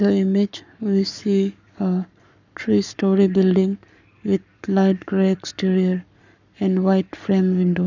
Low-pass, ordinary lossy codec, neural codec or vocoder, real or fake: 7.2 kHz; none; codec, 44.1 kHz, 7.8 kbps, Pupu-Codec; fake